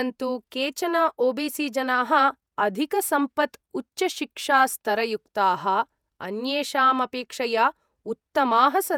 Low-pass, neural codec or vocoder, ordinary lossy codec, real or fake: 19.8 kHz; vocoder, 48 kHz, 128 mel bands, Vocos; none; fake